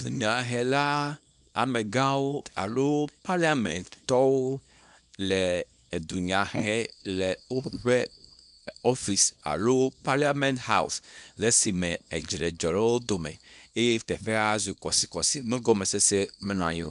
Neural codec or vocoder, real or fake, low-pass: codec, 24 kHz, 0.9 kbps, WavTokenizer, small release; fake; 10.8 kHz